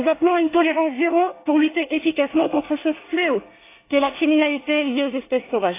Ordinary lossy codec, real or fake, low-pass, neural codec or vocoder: AAC, 24 kbps; fake; 3.6 kHz; codec, 24 kHz, 1 kbps, SNAC